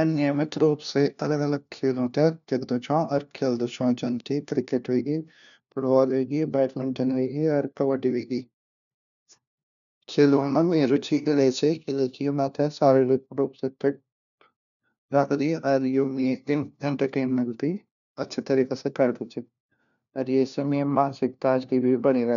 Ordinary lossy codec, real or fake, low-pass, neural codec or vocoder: none; fake; 7.2 kHz; codec, 16 kHz, 1 kbps, FunCodec, trained on LibriTTS, 50 frames a second